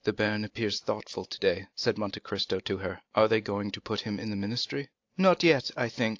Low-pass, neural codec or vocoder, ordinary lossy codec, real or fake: 7.2 kHz; none; AAC, 48 kbps; real